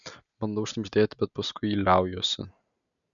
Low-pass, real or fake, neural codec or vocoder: 7.2 kHz; real; none